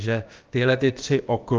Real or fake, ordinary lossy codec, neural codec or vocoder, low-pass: fake; Opus, 32 kbps; codec, 16 kHz, about 1 kbps, DyCAST, with the encoder's durations; 7.2 kHz